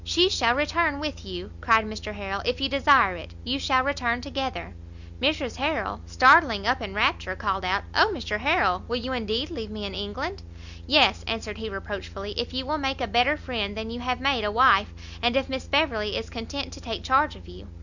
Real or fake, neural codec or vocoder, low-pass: real; none; 7.2 kHz